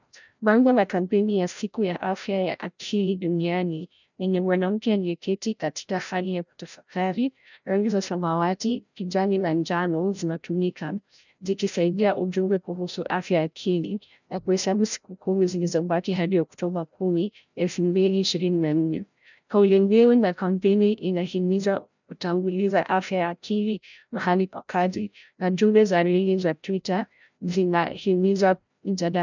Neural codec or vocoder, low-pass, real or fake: codec, 16 kHz, 0.5 kbps, FreqCodec, larger model; 7.2 kHz; fake